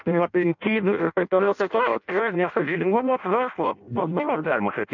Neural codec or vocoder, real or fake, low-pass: codec, 16 kHz in and 24 kHz out, 0.6 kbps, FireRedTTS-2 codec; fake; 7.2 kHz